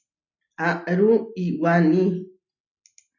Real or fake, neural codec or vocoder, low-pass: real; none; 7.2 kHz